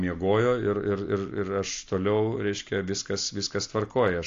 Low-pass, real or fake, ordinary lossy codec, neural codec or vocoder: 7.2 kHz; real; AAC, 48 kbps; none